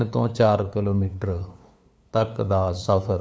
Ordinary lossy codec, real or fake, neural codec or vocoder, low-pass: none; fake; codec, 16 kHz, 2 kbps, FunCodec, trained on LibriTTS, 25 frames a second; none